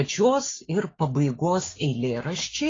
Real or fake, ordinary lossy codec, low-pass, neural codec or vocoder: fake; AAC, 32 kbps; 7.2 kHz; codec, 16 kHz, 6 kbps, DAC